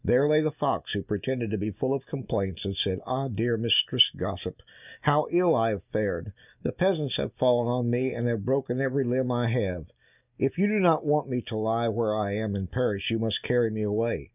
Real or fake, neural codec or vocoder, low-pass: real; none; 3.6 kHz